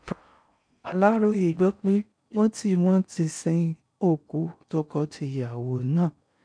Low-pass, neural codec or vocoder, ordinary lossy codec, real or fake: 9.9 kHz; codec, 16 kHz in and 24 kHz out, 0.6 kbps, FocalCodec, streaming, 4096 codes; none; fake